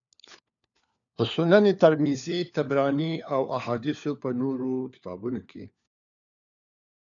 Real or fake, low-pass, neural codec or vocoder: fake; 7.2 kHz; codec, 16 kHz, 4 kbps, FunCodec, trained on LibriTTS, 50 frames a second